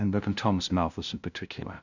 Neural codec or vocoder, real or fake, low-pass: codec, 16 kHz, 0.5 kbps, FunCodec, trained on LibriTTS, 25 frames a second; fake; 7.2 kHz